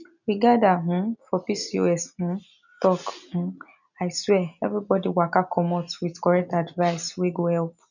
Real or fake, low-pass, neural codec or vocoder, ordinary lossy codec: real; 7.2 kHz; none; none